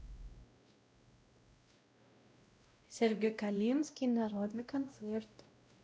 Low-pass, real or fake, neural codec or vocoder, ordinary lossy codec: none; fake; codec, 16 kHz, 0.5 kbps, X-Codec, WavLM features, trained on Multilingual LibriSpeech; none